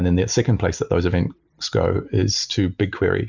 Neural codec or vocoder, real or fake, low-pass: none; real; 7.2 kHz